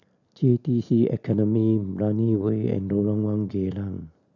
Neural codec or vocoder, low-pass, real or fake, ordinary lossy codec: none; 7.2 kHz; real; none